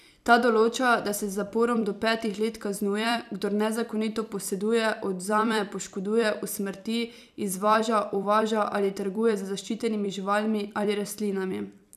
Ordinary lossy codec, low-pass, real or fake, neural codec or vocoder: none; 14.4 kHz; fake; vocoder, 44.1 kHz, 128 mel bands every 256 samples, BigVGAN v2